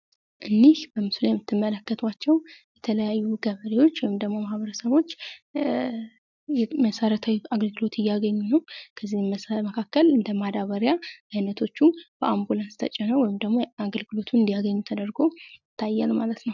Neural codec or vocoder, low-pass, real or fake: none; 7.2 kHz; real